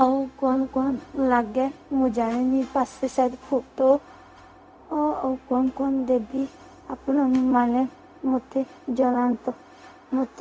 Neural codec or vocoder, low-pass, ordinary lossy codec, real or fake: codec, 16 kHz, 0.4 kbps, LongCat-Audio-Codec; none; none; fake